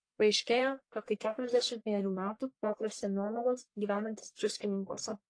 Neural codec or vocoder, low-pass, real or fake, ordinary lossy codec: codec, 44.1 kHz, 1.7 kbps, Pupu-Codec; 9.9 kHz; fake; AAC, 48 kbps